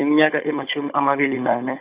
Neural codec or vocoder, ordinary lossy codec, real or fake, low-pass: codec, 16 kHz, 16 kbps, FunCodec, trained on Chinese and English, 50 frames a second; Opus, 64 kbps; fake; 3.6 kHz